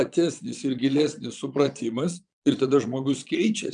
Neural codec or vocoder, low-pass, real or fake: vocoder, 22.05 kHz, 80 mel bands, Vocos; 9.9 kHz; fake